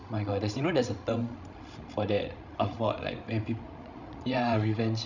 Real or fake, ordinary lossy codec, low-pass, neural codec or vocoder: fake; none; 7.2 kHz; codec, 16 kHz, 16 kbps, FreqCodec, larger model